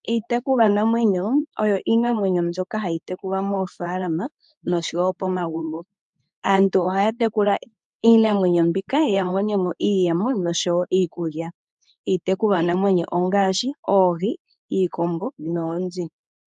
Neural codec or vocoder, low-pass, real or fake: codec, 24 kHz, 0.9 kbps, WavTokenizer, medium speech release version 2; 10.8 kHz; fake